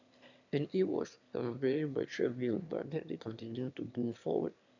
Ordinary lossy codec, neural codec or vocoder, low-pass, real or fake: none; autoencoder, 22.05 kHz, a latent of 192 numbers a frame, VITS, trained on one speaker; 7.2 kHz; fake